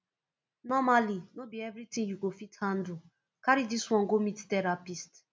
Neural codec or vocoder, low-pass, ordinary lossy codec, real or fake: none; none; none; real